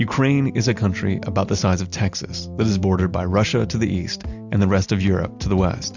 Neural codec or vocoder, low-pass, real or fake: none; 7.2 kHz; real